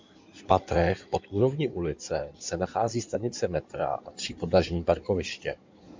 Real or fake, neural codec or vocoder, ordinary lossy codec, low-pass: fake; codec, 16 kHz in and 24 kHz out, 2.2 kbps, FireRedTTS-2 codec; MP3, 64 kbps; 7.2 kHz